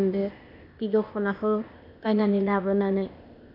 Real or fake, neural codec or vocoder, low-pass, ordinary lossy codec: fake; codec, 16 kHz, 0.8 kbps, ZipCodec; 5.4 kHz; none